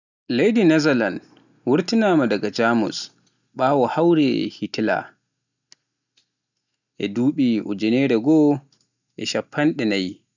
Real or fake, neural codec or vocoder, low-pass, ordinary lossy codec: real; none; 7.2 kHz; none